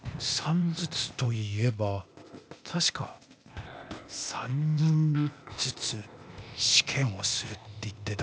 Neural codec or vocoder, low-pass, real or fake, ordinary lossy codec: codec, 16 kHz, 0.8 kbps, ZipCodec; none; fake; none